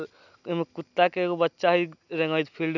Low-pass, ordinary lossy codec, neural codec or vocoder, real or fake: 7.2 kHz; none; none; real